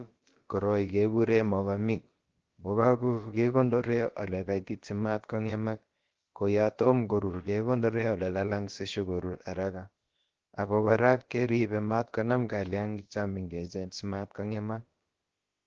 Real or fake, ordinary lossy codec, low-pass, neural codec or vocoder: fake; Opus, 16 kbps; 7.2 kHz; codec, 16 kHz, about 1 kbps, DyCAST, with the encoder's durations